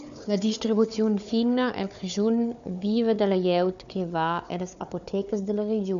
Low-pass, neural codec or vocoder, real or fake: 7.2 kHz; codec, 16 kHz, 4 kbps, FunCodec, trained on Chinese and English, 50 frames a second; fake